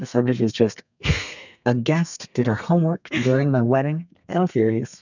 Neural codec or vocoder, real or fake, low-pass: codec, 32 kHz, 1.9 kbps, SNAC; fake; 7.2 kHz